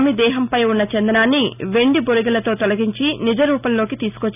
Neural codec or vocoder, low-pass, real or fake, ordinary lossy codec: none; 3.6 kHz; real; none